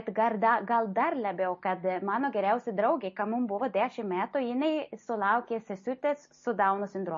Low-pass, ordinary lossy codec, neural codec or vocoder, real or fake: 7.2 kHz; MP3, 32 kbps; none; real